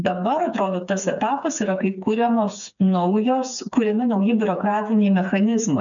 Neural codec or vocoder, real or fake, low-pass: codec, 16 kHz, 4 kbps, FreqCodec, smaller model; fake; 7.2 kHz